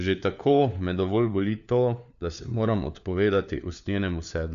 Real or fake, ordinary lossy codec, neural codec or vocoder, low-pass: fake; none; codec, 16 kHz, 4 kbps, FunCodec, trained on LibriTTS, 50 frames a second; 7.2 kHz